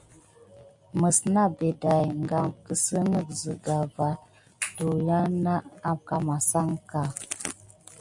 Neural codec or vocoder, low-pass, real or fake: none; 10.8 kHz; real